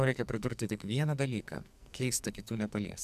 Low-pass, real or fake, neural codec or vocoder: 14.4 kHz; fake; codec, 44.1 kHz, 2.6 kbps, SNAC